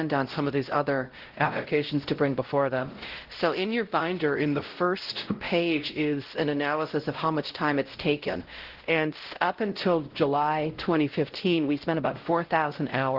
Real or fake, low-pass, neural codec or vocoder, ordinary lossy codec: fake; 5.4 kHz; codec, 16 kHz, 0.5 kbps, X-Codec, WavLM features, trained on Multilingual LibriSpeech; Opus, 16 kbps